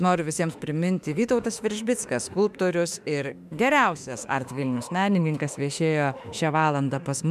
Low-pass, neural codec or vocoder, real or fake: 14.4 kHz; autoencoder, 48 kHz, 32 numbers a frame, DAC-VAE, trained on Japanese speech; fake